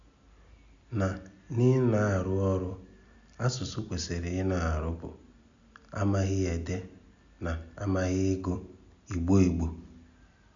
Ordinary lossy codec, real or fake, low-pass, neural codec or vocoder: MP3, 64 kbps; real; 7.2 kHz; none